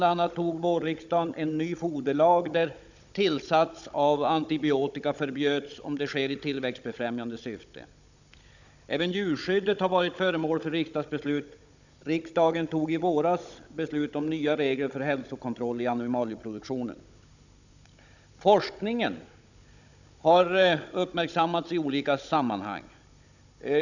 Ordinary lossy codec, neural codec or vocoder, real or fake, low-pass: none; codec, 16 kHz, 16 kbps, FunCodec, trained on Chinese and English, 50 frames a second; fake; 7.2 kHz